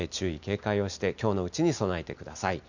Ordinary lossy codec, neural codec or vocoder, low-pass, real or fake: none; none; 7.2 kHz; real